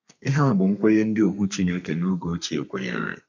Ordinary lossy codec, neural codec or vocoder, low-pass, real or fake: MP3, 64 kbps; codec, 32 kHz, 1.9 kbps, SNAC; 7.2 kHz; fake